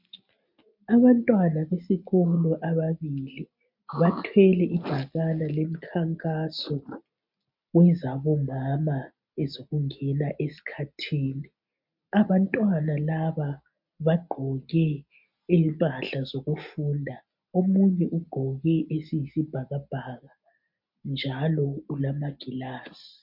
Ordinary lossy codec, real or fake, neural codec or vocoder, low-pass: MP3, 48 kbps; real; none; 5.4 kHz